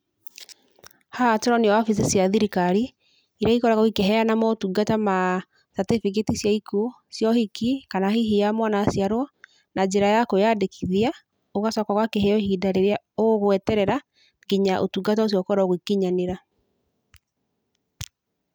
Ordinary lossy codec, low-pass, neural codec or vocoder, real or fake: none; none; none; real